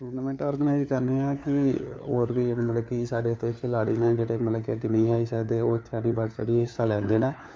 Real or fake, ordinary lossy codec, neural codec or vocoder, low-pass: fake; none; codec, 16 kHz, 4 kbps, FunCodec, trained on LibriTTS, 50 frames a second; none